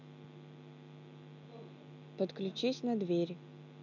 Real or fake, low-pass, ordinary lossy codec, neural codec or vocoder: real; 7.2 kHz; none; none